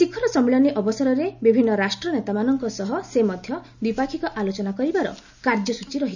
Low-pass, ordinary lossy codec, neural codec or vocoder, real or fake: 7.2 kHz; none; none; real